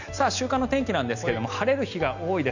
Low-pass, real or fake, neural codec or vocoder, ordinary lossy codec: 7.2 kHz; real; none; none